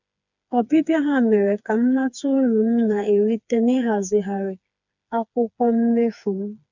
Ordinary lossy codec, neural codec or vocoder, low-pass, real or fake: none; codec, 16 kHz, 4 kbps, FreqCodec, smaller model; 7.2 kHz; fake